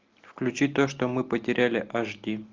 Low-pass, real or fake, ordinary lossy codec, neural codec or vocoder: 7.2 kHz; real; Opus, 32 kbps; none